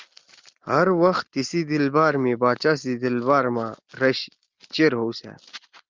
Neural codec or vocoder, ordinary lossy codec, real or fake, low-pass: none; Opus, 32 kbps; real; 7.2 kHz